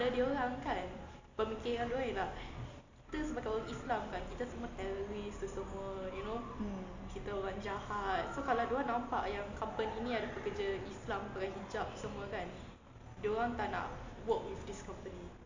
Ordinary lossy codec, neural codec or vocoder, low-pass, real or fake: AAC, 48 kbps; none; 7.2 kHz; real